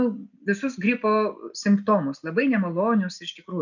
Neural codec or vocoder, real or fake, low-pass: none; real; 7.2 kHz